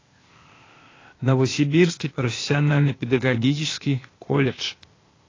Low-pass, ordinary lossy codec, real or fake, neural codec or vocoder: 7.2 kHz; AAC, 32 kbps; fake; codec, 16 kHz, 0.8 kbps, ZipCodec